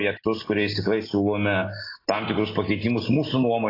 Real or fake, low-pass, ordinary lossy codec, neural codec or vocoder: real; 5.4 kHz; AAC, 24 kbps; none